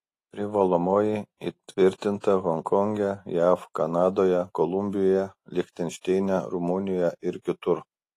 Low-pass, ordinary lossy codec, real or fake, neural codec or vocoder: 14.4 kHz; AAC, 48 kbps; real; none